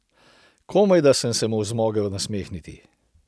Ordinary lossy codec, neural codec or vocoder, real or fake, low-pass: none; none; real; none